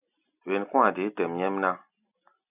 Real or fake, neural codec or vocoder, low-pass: real; none; 3.6 kHz